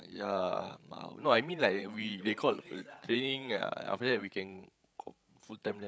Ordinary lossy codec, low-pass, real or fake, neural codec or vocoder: none; none; fake; codec, 16 kHz, 8 kbps, FreqCodec, larger model